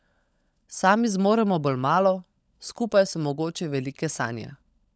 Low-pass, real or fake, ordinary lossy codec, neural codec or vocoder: none; fake; none; codec, 16 kHz, 16 kbps, FunCodec, trained on LibriTTS, 50 frames a second